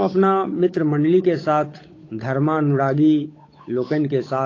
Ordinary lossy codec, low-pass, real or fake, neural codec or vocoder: AAC, 32 kbps; 7.2 kHz; fake; codec, 16 kHz, 8 kbps, FunCodec, trained on Chinese and English, 25 frames a second